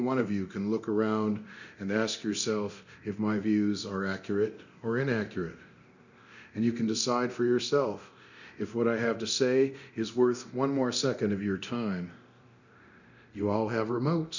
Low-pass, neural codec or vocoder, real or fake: 7.2 kHz; codec, 24 kHz, 0.9 kbps, DualCodec; fake